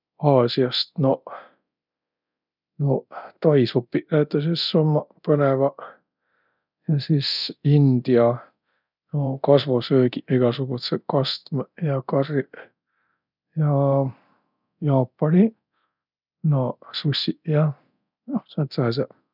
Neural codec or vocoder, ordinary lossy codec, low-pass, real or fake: codec, 24 kHz, 0.9 kbps, DualCodec; none; 5.4 kHz; fake